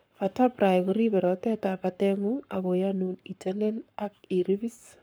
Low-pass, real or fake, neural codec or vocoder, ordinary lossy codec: none; fake; codec, 44.1 kHz, 7.8 kbps, Pupu-Codec; none